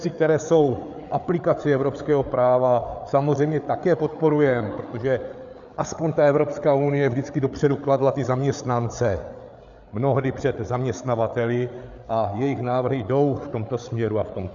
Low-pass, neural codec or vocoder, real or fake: 7.2 kHz; codec, 16 kHz, 8 kbps, FreqCodec, larger model; fake